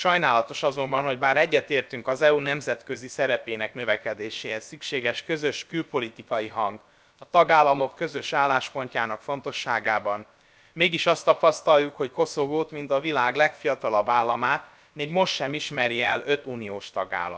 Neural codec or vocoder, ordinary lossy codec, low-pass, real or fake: codec, 16 kHz, about 1 kbps, DyCAST, with the encoder's durations; none; none; fake